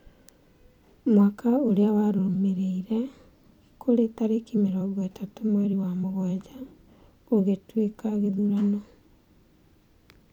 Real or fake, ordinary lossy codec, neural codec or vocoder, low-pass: fake; none; vocoder, 44.1 kHz, 128 mel bands every 256 samples, BigVGAN v2; 19.8 kHz